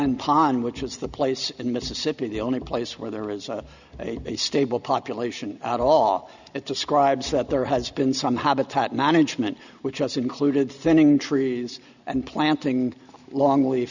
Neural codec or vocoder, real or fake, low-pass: none; real; 7.2 kHz